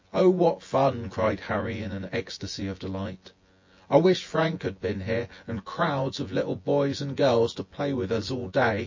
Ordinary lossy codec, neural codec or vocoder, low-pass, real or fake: MP3, 32 kbps; vocoder, 24 kHz, 100 mel bands, Vocos; 7.2 kHz; fake